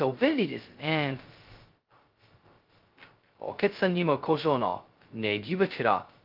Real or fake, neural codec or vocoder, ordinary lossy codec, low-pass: fake; codec, 16 kHz, 0.2 kbps, FocalCodec; Opus, 32 kbps; 5.4 kHz